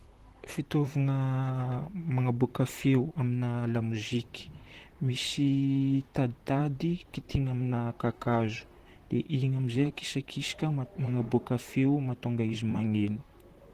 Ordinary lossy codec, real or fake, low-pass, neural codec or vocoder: Opus, 16 kbps; fake; 14.4 kHz; vocoder, 44.1 kHz, 128 mel bands, Pupu-Vocoder